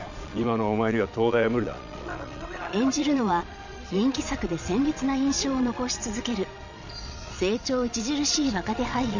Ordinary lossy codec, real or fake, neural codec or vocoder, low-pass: none; fake; vocoder, 22.05 kHz, 80 mel bands, Vocos; 7.2 kHz